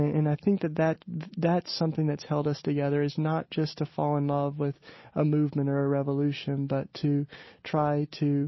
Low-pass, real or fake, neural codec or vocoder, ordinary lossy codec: 7.2 kHz; real; none; MP3, 24 kbps